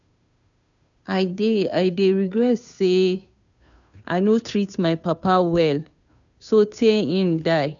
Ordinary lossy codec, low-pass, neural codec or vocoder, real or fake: none; 7.2 kHz; codec, 16 kHz, 2 kbps, FunCodec, trained on Chinese and English, 25 frames a second; fake